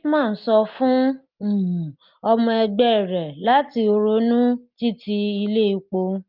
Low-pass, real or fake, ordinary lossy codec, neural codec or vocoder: 5.4 kHz; real; Opus, 24 kbps; none